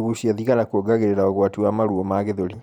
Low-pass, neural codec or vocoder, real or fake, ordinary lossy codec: 19.8 kHz; vocoder, 44.1 kHz, 128 mel bands every 512 samples, BigVGAN v2; fake; none